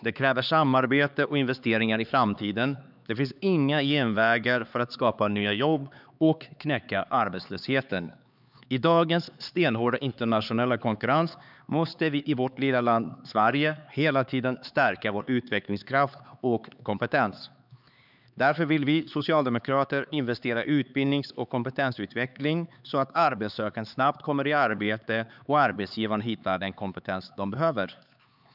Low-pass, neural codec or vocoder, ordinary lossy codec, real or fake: 5.4 kHz; codec, 16 kHz, 4 kbps, X-Codec, HuBERT features, trained on LibriSpeech; none; fake